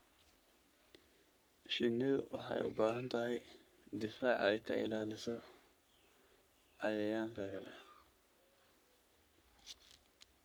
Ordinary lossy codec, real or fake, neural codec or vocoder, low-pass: none; fake; codec, 44.1 kHz, 3.4 kbps, Pupu-Codec; none